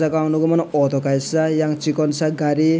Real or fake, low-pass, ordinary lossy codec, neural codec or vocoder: real; none; none; none